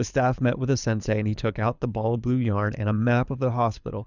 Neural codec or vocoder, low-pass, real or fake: codec, 24 kHz, 6 kbps, HILCodec; 7.2 kHz; fake